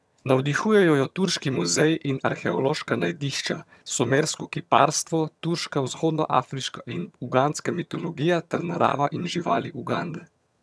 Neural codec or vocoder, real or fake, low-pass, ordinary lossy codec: vocoder, 22.05 kHz, 80 mel bands, HiFi-GAN; fake; none; none